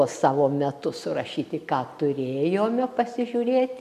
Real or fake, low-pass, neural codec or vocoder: real; 14.4 kHz; none